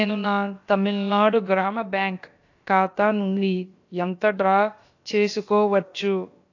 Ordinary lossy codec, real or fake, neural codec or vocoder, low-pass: AAC, 48 kbps; fake; codec, 16 kHz, about 1 kbps, DyCAST, with the encoder's durations; 7.2 kHz